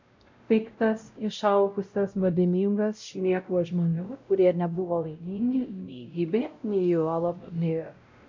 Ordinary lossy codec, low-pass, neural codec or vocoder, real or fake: MP3, 64 kbps; 7.2 kHz; codec, 16 kHz, 0.5 kbps, X-Codec, WavLM features, trained on Multilingual LibriSpeech; fake